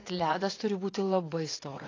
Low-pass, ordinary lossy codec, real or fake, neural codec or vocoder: 7.2 kHz; AAC, 32 kbps; fake; vocoder, 44.1 kHz, 80 mel bands, Vocos